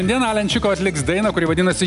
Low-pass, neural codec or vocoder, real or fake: 10.8 kHz; none; real